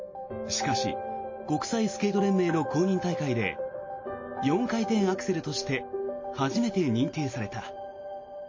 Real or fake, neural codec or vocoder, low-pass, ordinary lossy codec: real; none; 7.2 kHz; MP3, 32 kbps